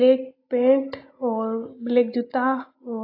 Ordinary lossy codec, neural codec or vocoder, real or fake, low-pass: AAC, 32 kbps; none; real; 5.4 kHz